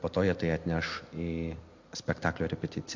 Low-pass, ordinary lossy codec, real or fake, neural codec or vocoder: 7.2 kHz; MP3, 48 kbps; real; none